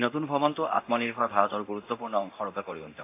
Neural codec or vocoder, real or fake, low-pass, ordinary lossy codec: codec, 24 kHz, 0.9 kbps, DualCodec; fake; 3.6 kHz; AAC, 24 kbps